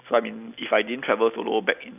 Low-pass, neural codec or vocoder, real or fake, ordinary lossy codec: 3.6 kHz; none; real; none